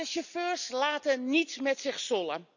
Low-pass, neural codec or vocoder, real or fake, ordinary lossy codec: 7.2 kHz; none; real; none